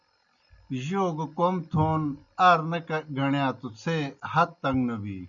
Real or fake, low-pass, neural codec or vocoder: real; 7.2 kHz; none